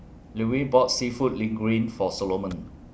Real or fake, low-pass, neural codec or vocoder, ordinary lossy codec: real; none; none; none